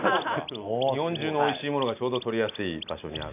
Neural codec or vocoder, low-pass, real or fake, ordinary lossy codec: none; 3.6 kHz; real; none